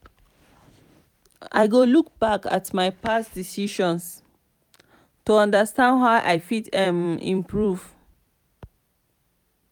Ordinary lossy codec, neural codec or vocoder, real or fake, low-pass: none; vocoder, 44.1 kHz, 128 mel bands every 256 samples, BigVGAN v2; fake; 19.8 kHz